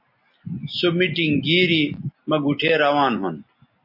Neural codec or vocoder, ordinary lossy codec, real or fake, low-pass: none; MP3, 32 kbps; real; 5.4 kHz